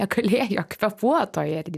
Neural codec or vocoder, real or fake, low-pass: none; real; 14.4 kHz